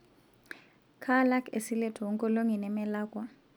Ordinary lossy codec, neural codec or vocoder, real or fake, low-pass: none; none; real; none